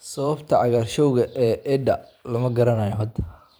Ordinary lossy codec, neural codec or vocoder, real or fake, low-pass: none; vocoder, 44.1 kHz, 128 mel bands every 512 samples, BigVGAN v2; fake; none